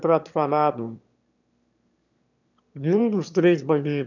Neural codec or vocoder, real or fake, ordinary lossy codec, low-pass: autoencoder, 22.05 kHz, a latent of 192 numbers a frame, VITS, trained on one speaker; fake; none; 7.2 kHz